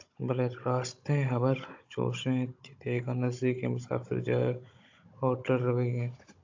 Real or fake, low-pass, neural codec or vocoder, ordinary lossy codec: fake; 7.2 kHz; codec, 16 kHz, 16 kbps, FunCodec, trained on Chinese and English, 50 frames a second; AAC, 48 kbps